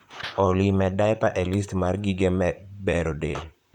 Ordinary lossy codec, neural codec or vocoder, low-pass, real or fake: none; vocoder, 44.1 kHz, 128 mel bands, Pupu-Vocoder; 19.8 kHz; fake